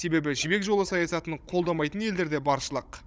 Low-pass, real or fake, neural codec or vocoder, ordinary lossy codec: none; fake; codec, 16 kHz, 16 kbps, FunCodec, trained on Chinese and English, 50 frames a second; none